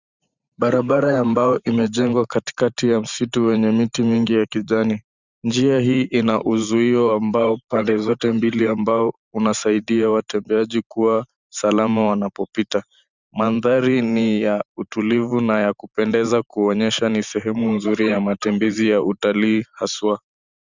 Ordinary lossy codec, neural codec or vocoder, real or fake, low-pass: Opus, 64 kbps; vocoder, 44.1 kHz, 128 mel bands every 512 samples, BigVGAN v2; fake; 7.2 kHz